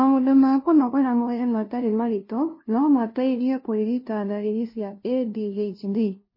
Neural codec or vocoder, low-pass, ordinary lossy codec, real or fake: codec, 16 kHz, 0.5 kbps, FunCodec, trained on LibriTTS, 25 frames a second; 5.4 kHz; MP3, 24 kbps; fake